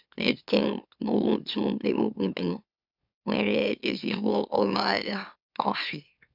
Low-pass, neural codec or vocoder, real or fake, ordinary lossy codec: 5.4 kHz; autoencoder, 44.1 kHz, a latent of 192 numbers a frame, MeloTTS; fake; none